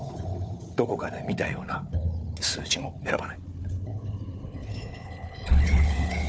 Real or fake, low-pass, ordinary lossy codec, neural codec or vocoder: fake; none; none; codec, 16 kHz, 4 kbps, FunCodec, trained on Chinese and English, 50 frames a second